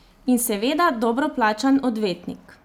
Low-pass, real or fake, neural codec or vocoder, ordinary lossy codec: 19.8 kHz; real; none; none